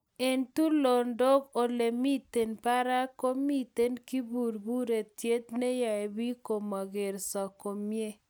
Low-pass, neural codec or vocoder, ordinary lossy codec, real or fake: none; none; none; real